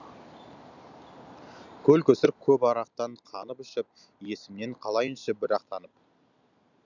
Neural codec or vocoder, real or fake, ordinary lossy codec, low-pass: none; real; none; 7.2 kHz